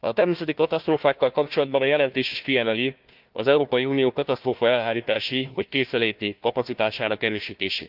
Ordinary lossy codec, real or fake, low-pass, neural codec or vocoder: Opus, 24 kbps; fake; 5.4 kHz; codec, 16 kHz, 1 kbps, FunCodec, trained on Chinese and English, 50 frames a second